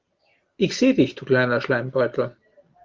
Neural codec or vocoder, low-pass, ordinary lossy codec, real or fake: none; 7.2 kHz; Opus, 32 kbps; real